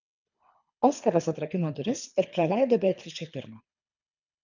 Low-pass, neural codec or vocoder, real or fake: 7.2 kHz; codec, 24 kHz, 3 kbps, HILCodec; fake